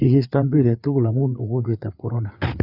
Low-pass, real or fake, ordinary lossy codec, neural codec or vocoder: 5.4 kHz; fake; none; codec, 16 kHz, 4 kbps, FreqCodec, larger model